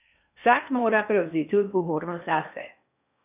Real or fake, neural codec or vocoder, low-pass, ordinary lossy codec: fake; codec, 16 kHz in and 24 kHz out, 0.8 kbps, FocalCodec, streaming, 65536 codes; 3.6 kHz; none